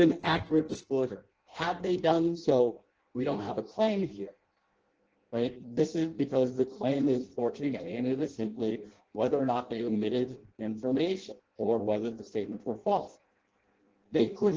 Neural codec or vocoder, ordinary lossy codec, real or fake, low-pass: codec, 16 kHz in and 24 kHz out, 0.6 kbps, FireRedTTS-2 codec; Opus, 16 kbps; fake; 7.2 kHz